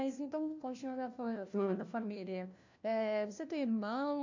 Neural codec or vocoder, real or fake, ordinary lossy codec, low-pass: codec, 16 kHz, 1 kbps, FunCodec, trained on LibriTTS, 50 frames a second; fake; none; 7.2 kHz